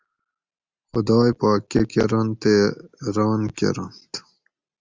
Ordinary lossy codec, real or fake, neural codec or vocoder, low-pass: Opus, 32 kbps; real; none; 7.2 kHz